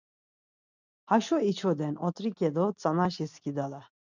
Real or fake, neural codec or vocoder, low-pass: real; none; 7.2 kHz